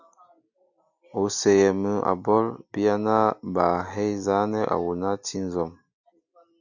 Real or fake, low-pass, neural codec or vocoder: real; 7.2 kHz; none